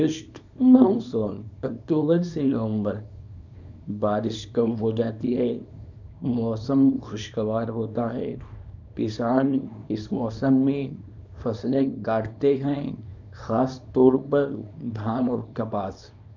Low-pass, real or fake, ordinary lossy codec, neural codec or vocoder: 7.2 kHz; fake; none; codec, 24 kHz, 0.9 kbps, WavTokenizer, small release